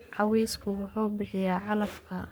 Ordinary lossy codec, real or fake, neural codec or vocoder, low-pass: none; fake; codec, 44.1 kHz, 3.4 kbps, Pupu-Codec; none